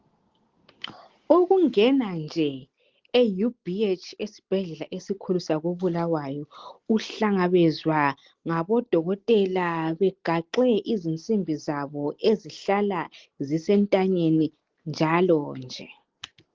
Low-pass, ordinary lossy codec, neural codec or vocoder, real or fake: 7.2 kHz; Opus, 16 kbps; none; real